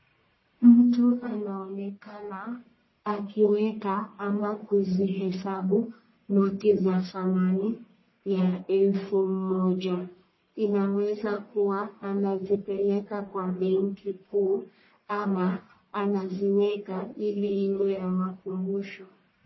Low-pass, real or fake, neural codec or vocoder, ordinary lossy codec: 7.2 kHz; fake; codec, 44.1 kHz, 1.7 kbps, Pupu-Codec; MP3, 24 kbps